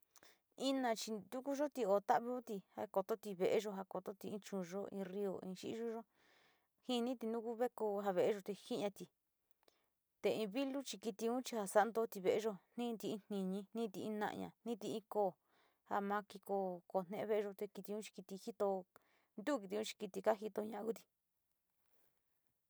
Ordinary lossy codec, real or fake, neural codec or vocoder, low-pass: none; real; none; none